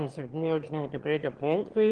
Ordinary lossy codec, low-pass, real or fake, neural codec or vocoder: Opus, 16 kbps; 9.9 kHz; fake; autoencoder, 22.05 kHz, a latent of 192 numbers a frame, VITS, trained on one speaker